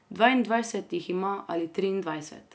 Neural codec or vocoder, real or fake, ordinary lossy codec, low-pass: none; real; none; none